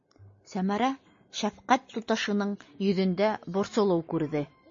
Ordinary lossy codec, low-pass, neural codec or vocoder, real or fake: MP3, 32 kbps; 7.2 kHz; none; real